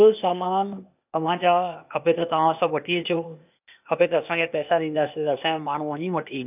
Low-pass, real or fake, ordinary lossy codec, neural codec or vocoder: 3.6 kHz; fake; none; codec, 16 kHz, 0.8 kbps, ZipCodec